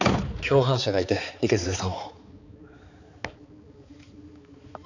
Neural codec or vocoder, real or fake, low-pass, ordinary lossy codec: codec, 16 kHz, 4 kbps, X-Codec, HuBERT features, trained on balanced general audio; fake; 7.2 kHz; none